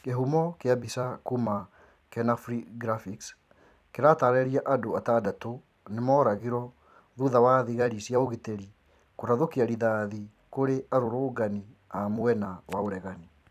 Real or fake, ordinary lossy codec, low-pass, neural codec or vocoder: fake; none; 14.4 kHz; vocoder, 44.1 kHz, 128 mel bands every 256 samples, BigVGAN v2